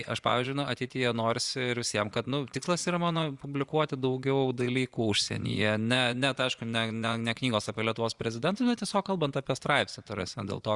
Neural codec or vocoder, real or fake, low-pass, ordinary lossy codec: vocoder, 24 kHz, 100 mel bands, Vocos; fake; 10.8 kHz; Opus, 64 kbps